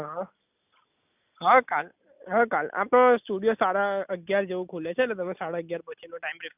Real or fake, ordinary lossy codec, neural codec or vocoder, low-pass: real; none; none; 3.6 kHz